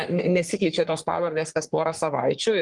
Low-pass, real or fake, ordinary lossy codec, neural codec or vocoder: 10.8 kHz; fake; Opus, 24 kbps; codec, 44.1 kHz, 3.4 kbps, Pupu-Codec